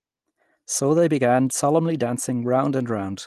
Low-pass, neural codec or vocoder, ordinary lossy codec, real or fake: 14.4 kHz; none; Opus, 24 kbps; real